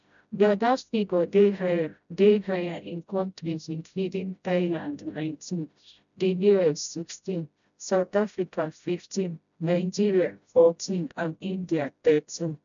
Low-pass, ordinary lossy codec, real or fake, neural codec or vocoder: 7.2 kHz; none; fake; codec, 16 kHz, 0.5 kbps, FreqCodec, smaller model